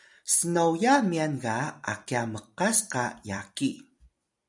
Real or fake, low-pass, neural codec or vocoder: fake; 10.8 kHz; vocoder, 44.1 kHz, 128 mel bands every 256 samples, BigVGAN v2